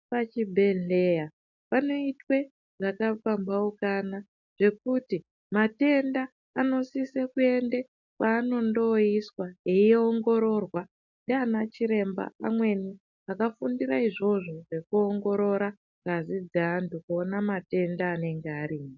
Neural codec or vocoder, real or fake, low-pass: none; real; 7.2 kHz